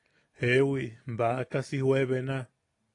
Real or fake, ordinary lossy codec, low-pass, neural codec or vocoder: real; AAC, 48 kbps; 10.8 kHz; none